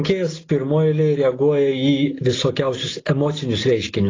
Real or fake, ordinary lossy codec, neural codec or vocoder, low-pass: real; AAC, 32 kbps; none; 7.2 kHz